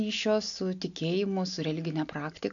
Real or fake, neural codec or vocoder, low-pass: real; none; 7.2 kHz